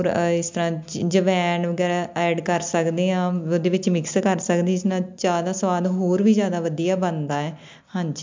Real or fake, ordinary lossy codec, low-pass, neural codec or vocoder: real; MP3, 64 kbps; 7.2 kHz; none